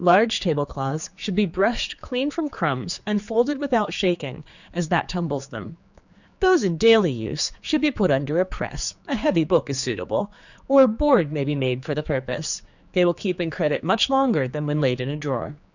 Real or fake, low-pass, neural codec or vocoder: fake; 7.2 kHz; codec, 16 kHz, 4 kbps, X-Codec, HuBERT features, trained on general audio